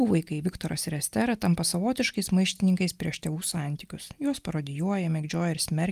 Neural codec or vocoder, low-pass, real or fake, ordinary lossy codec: none; 14.4 kHz; real; Opus, 32 kbps